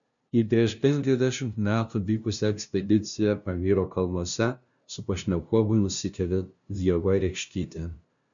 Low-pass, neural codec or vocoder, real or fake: 7.2 kHz; codec, 16 kHz, 0.5 kbps, FunCodec, trained on LibriTTS, 25 frames a second; fake